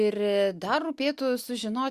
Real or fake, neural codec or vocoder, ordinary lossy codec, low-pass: real; none; Opus, 64 kbps; 14.4 kHz